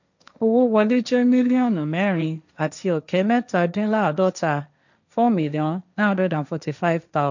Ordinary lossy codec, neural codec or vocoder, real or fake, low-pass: none; codec, 16 kHz, 1.1 kbps, Voila-Tokenizer; fake; 7.2 kHz